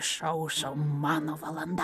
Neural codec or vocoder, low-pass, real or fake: none; 14.4 kHz; real